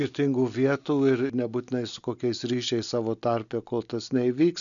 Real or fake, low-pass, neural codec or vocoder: real; 7.2 kHz; none